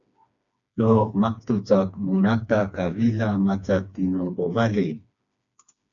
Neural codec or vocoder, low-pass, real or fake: codec, 16 kHz, 2 kbps, FreqCodec, smaller model; 7.2 kHz; fake